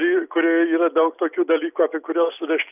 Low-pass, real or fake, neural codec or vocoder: 3.6 kHz; real; none